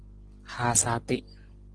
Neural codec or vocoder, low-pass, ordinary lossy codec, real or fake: none; 9.9 kHz; Opus, 16 kbps; real